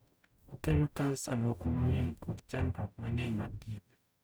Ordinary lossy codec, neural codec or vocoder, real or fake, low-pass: none; codec, 44.1 kHz, 0.9 kbps, DAC; fake; none